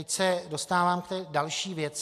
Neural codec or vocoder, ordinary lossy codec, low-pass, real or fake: none; AAC, 64 kbps; 14.4 kHz; real